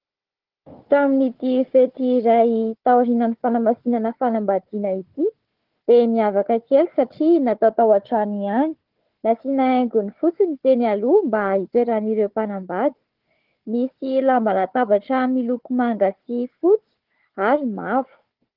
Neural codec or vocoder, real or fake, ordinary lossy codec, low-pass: codec, 16 kHz, 4 kbps, FunCodec, trained on Chinese and English, 50 frames a second; fake; Opus, 16 kbps; 5.4 kHz